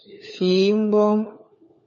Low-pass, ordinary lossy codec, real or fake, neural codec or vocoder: 7.2 kHz; MP3, 32 kbps; fake; codec, 16 kHz, 4 kbps, FunCodec, trained on LibriTTS, 50 frames a second